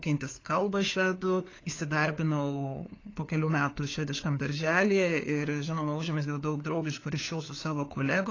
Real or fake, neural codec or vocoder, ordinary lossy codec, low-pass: fake; codec, 16 kHz, 4 kbps, FunCodec, trained on LibriTTS, 50 frames a second; AAC, 32 kbps; 7.2 kHz